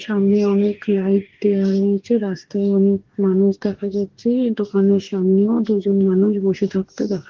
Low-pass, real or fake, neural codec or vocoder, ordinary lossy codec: 7.2 kHz; fake; codec, 44.1 kHz, 2.6 kbps, DAC; Opus, 16 kbps